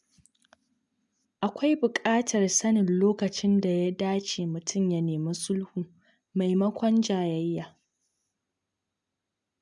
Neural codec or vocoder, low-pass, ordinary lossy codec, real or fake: none; 10.8 kHz; none; real